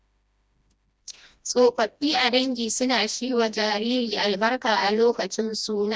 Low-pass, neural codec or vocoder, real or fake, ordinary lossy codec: none; codec, 16 kHz, 1 kbps, FreqCodec, smaller model; fake; none